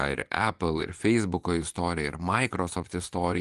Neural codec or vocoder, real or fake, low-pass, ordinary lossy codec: vocoder, 24 kHz, 100 mel bands, Vocos; fake; 10.8 kHz; Opus, 24 kbps